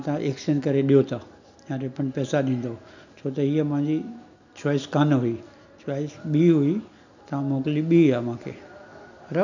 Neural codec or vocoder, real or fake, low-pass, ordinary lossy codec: none; real; 7.2 kHz; none